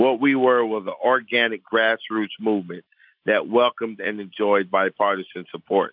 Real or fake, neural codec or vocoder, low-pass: real; none; 5.4 kHz